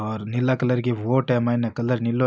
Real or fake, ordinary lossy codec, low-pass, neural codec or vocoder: real; none; none; none